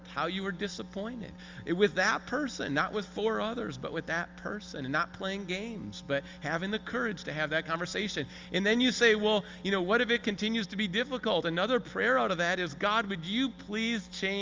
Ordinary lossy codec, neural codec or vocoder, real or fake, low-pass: Opus, 32 kbps; none; real; 7.2 kHz